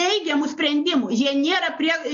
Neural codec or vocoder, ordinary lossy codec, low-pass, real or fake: none; MP3, 48 kbps; 7.2 kHz; real